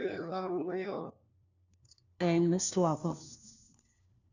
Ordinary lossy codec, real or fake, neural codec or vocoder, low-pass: none; fake; codec, 16 kHz, 1 kbps, FunCodec, trained on LibriTTS, 50 frames a second; 7.2 kHz